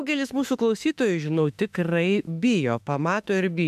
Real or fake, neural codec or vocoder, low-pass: fake; autoencoder, 48 kHz, 32 numbers a frame, DAC-VAE, trained on Japanese speech; 14.4 kHz